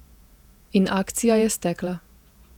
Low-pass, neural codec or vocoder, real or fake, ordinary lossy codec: 19.8 kHz; vocoder, 48 kHz, 128 mel bands, Vocos; fake; none